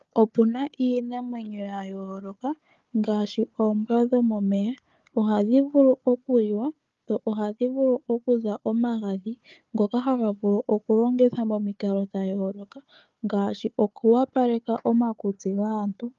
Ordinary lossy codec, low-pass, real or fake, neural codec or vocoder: Opus, 32 kbps; 7.2 kHz; fake; codec, 16 kHz, 4 kbps, FunCodec, trained on Chinese and English, 50 frames a second